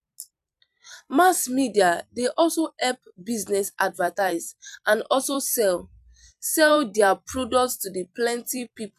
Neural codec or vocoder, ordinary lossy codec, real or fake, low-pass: vocoder, 44.1 kHz, 128 mel bands every 256 samples, BigVGAN v2; none; fake; 14.4 kHz